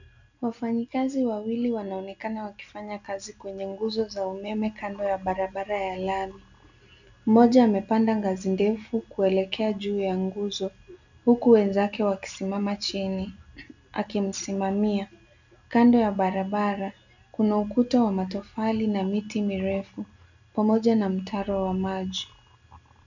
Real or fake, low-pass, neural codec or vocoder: real; 7.2 kHz; none